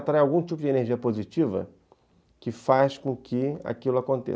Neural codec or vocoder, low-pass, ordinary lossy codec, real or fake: none; none; none; real